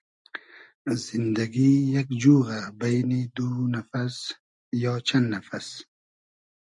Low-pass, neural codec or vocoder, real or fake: 10.8 kHz; none; real